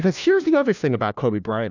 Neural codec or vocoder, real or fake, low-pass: codec, 16 kHz, 1 kbps, FunCodec, trained on LibriTTS, 50 frames a second; fake; 7.2 kHz